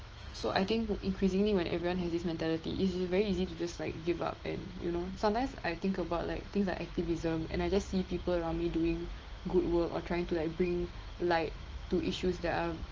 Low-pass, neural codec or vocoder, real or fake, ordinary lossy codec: 7.2 kHz; autoencoder, 48 kHz, 128 numbers a frame, DAC-VAE, trained on Japanese speech; fake; Opus, 16 kbps